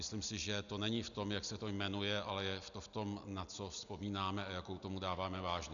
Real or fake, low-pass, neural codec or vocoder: real; 7.2 kHz; none